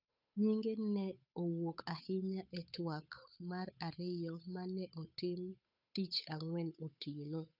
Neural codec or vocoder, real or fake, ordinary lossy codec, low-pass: codec, 16 kHz, 8 kbps, FunCodec, trained on Chinese and English, 25 frames a second; fake; none; 5.4 kHz